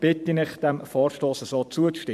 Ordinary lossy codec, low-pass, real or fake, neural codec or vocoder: MP3, 96 kbps; 14.4 kHz; fake; vocoder, 44.1 kHz, 128 mel bands every 512 samples, BigVGAN v2